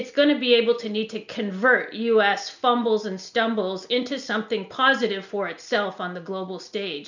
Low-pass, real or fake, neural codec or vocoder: 7.2 kHz; real; none